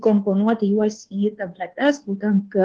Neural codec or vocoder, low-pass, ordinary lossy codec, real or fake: codec, 24 kHz, 1.2 kbps, DualCodec; 9.9 kHz; Opus, 16 kbps; fake